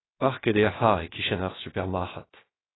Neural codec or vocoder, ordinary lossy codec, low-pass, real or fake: codec, 16 kHz, 0.7 kbps, FocalCodec; AAC, 16 kbps; 7.2 kHz; fake